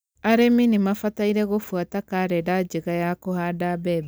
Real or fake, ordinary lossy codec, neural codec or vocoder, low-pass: real; none; none; none